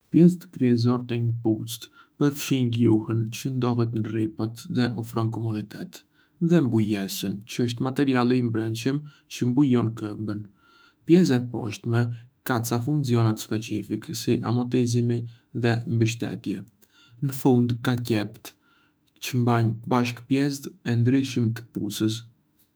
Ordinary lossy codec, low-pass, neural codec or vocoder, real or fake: none; none; autoencoder, 48 kHz, 32 numbers a frame, DAC-VAE, trained on Japanese speech; fake